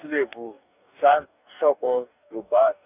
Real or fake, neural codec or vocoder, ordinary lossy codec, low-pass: fake; codec, 44.1 kHz, 2.6 kbps, DAC; none; 3.6 kHz